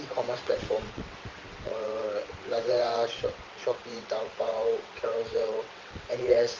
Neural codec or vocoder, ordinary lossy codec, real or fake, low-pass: vocoder, 22.05 kHz, 80 mel bands, WaveNeXt; Opus, 32 kbps; fake; 7.2 kHz